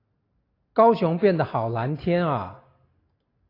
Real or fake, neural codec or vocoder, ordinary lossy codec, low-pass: real; none; AAC, 32 kbps; 5.4 kHz